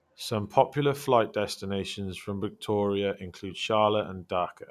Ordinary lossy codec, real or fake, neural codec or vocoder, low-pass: none; real; none; 14.4 kHz